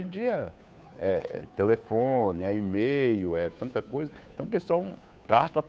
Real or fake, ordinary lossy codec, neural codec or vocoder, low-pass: fake; none; codec, 16 kHz, 2 kbps, FunCodec, trained on Chinese and English, 25 frames a second; none